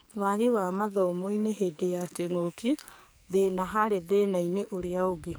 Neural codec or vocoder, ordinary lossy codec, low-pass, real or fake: codec, 44.1 kHz, 2.6 kbps, SNAC; none; none; fake